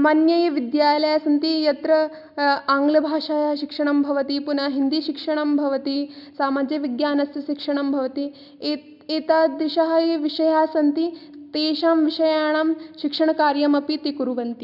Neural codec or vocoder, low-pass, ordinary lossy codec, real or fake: none; 5.4 kHz; none; real